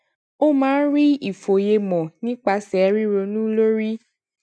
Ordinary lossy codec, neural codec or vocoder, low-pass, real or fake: none; none; none; real